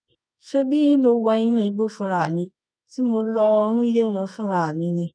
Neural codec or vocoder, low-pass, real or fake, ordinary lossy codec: codec, 24 kHz, 0.9 kbps, WavTokenizer, medium music audio release; 9.9 kHz; fake; none